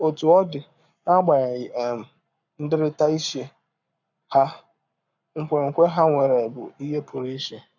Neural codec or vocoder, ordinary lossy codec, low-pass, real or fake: codec, 44.1 kHz, 7.8 kbps, Pupu-Codec; none; 7.2 kHz; fake